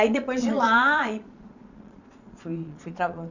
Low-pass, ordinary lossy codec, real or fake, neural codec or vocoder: 7.2 kHz; none; fake; vocoder, 22.05 kHz, 80 mel bands, Vocos